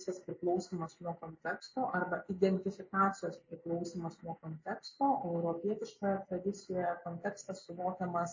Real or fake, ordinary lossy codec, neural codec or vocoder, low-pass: real; MP3, 32 kbps; none; 7.2 kHz